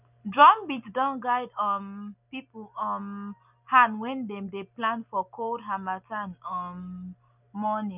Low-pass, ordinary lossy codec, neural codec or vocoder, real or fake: 3.6 kHz; none; none; real